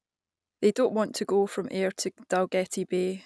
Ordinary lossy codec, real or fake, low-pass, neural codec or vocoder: none; real; none; none